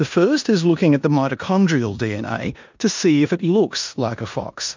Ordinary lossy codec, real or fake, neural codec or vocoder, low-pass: MP3, 64 kbps; fake; codec, 16 kHz in and 24 kHz out, 0.9 kbps, LongCat-Audio-Codec, four codebook decoder; 7.2 kHz